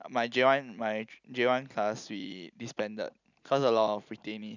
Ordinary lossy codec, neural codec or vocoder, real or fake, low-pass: none; none; real; 7.2 kHz